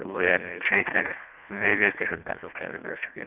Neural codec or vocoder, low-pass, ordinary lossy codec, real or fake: codec, 24 kHz, 1.5 kbps, HILCodec; 3.6 kHz; none; fake